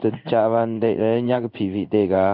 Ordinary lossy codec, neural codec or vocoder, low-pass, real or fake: none; codec, 16 kHz in and 24 kHz out, 1 kbps, XY-Tokenizer; 5.4 kHz; fake